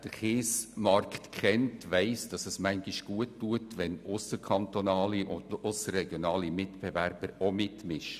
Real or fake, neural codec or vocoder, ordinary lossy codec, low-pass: real; none; none; 14.4 kHz